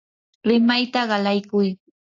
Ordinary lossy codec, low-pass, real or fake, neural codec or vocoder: AAC, 48 kbps; 7.2 kHz; real; none